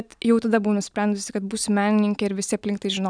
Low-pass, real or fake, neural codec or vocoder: 9.9 kHz; real; none